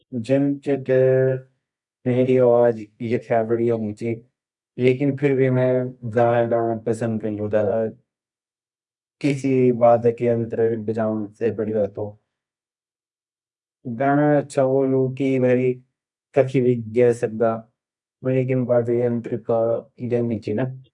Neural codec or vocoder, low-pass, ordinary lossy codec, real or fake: codec, 24 kHz, 0.9 kbps, WavTokenizer, medium music audio release; 10.8 kHz; none; fake